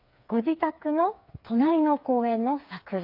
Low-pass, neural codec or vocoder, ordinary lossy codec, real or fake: 5.4 kHz; codec, 16 kHz, 4 kbps, FreqCodec, smaller model; AAC, 32 kbps; fake